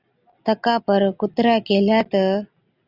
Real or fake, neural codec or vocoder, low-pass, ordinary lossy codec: real; none; 5.4 kHz; Opus, 64 kbps